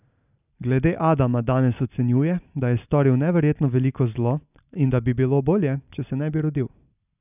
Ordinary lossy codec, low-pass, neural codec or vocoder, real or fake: none; 3.6 kHz; none; real